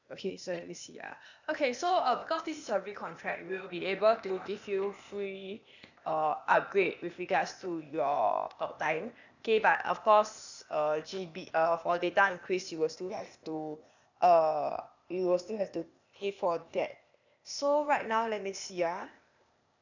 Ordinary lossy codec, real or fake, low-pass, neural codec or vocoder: none; fake; 7.2 kHz; codec, 16 kHz, 0.8 kbps, ZipCodec